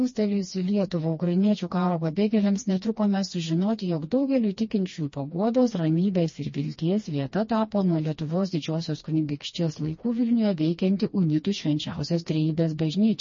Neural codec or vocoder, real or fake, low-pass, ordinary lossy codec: codec, 16 kHz, 2 kbps, FreqCodec, smaller model; fake; 7.2 kHz; MP3, 32 kbps